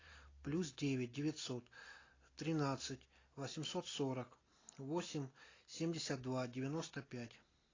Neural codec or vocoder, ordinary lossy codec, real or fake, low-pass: none; AAC, 32 kbps; real; 7.2 kHz